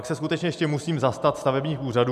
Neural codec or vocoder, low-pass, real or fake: none; 14.4 kHz; real